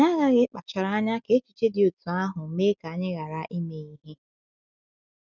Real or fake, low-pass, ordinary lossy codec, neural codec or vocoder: real; 7.2 kHz; none; none